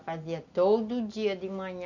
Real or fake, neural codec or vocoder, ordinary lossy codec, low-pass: real; none; none; 7.2 kHz